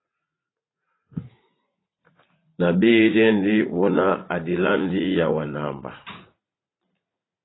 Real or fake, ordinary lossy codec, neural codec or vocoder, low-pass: fake; AAC, 16 kbps; vocoder, 44.1 kHz, 80 mel bands, Vocos; 7.2 kHz